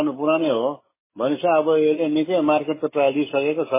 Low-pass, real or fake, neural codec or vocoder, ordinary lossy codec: 3.6 kHz; fake; autoencoder, 48 kHz, 128 numbers a frame, DAC-VAE, trained on Japanese speech; MP3, 16 kbps